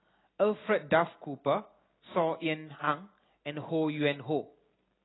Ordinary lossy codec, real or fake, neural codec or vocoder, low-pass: AAC, 16 kbps; real; none; 7.2 kHz